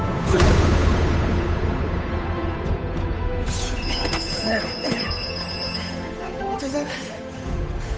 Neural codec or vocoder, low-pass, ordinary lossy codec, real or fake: codec, 16 kHz, 8 kbps, FunCodec, trained on Chinese and English, 25 frames a second; none; none; fake